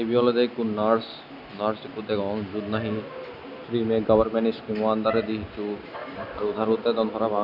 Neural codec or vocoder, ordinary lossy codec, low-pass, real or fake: none; none; 5.4 kHz; real